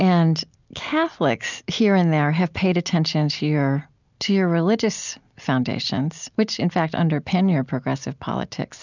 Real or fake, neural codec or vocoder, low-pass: real; none; 7.2 kHz